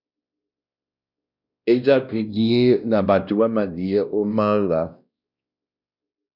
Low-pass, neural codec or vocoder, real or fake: 5.4 kHz; codec, 16 kHz, 1 kbps, X-Codec, WavLM features, trained on Multilingual LibriSpeech; fake